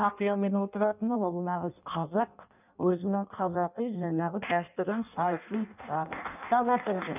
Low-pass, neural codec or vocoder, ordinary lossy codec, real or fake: 3.6 kHz; codec, 16 kHz in and 24 kHz out, 0.6 kbps, FireRedTTS-2 codec; none; fake